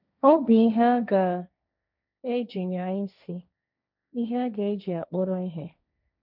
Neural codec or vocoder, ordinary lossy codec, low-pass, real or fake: codec, 16 kHz, 1.1 kbps, Voila-Tokenizer; none; 5.4 kHz; fake